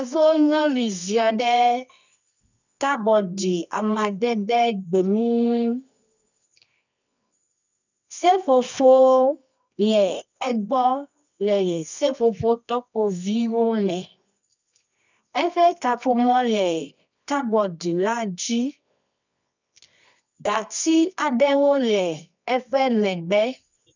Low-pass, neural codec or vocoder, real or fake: 7.2 kHz; codec, 24 kHz, 0.9 kbps, WavTokenizer, medium music audio release; fake